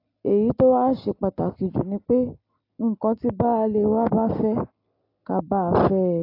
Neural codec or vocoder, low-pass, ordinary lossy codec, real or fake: none; 5.4 kHz; AAC, 32 kbps; real